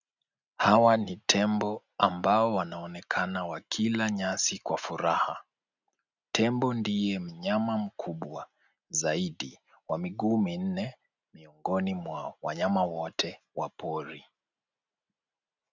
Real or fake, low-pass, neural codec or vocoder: real; 7.2 kHz; none